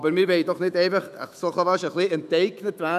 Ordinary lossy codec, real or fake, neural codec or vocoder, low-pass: none; fake; autoencoder, 48 kHz, 128 numbers a frame, DAC-VAE, trained on Japanese speech; 14.4 kHz